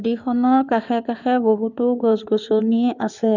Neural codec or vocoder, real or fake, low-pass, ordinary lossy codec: codec, 16 kHz in and 24 kHz out, 2.2 kbps, FireRedTTS-2 codec; fake; 7.2 kHz; none